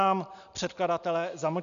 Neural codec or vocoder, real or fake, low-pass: none; real; 7.2 kHz